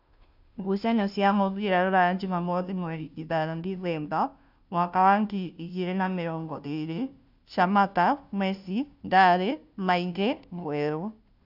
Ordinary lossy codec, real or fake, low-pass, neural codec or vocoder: none; fake; 5.4 kHz; codec, 16 kHz, 0.5 kbps, FunCodec, trained on Chinese and English, 25 frames a second